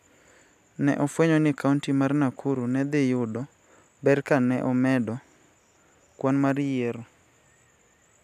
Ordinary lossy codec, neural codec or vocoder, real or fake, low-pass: none; none; real; 14.4 kHz